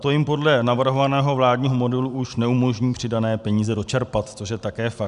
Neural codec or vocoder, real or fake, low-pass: none; real; 10.8 kHz